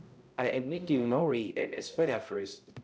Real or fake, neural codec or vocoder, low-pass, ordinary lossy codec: fake; codec, 16 kHz, 0.5 kbps, X-Codec, HuBERT features, trained on balanced general audio; none; none